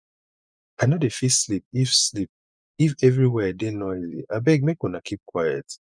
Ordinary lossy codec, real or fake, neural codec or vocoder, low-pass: none; fake; vocoder, 24 kHz, 100 mel bands, Vocos; 9.9 kHz